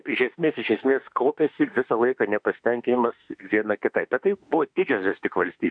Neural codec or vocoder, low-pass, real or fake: autoencoder, 48 kHz, 32 numbers a frame, DAC-VAE, trained on Japanese speech; 9.9 kHz; fake